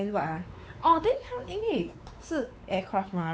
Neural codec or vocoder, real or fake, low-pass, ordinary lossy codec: codec, 16 kHz, 4 kbps, X-Codec, WavLM features, trained on Multilingual LibriSpeech; fake; none; none